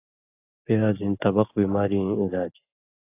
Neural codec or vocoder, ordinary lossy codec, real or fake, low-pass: none; AAC, 24 kbps; real; 3.6 kHz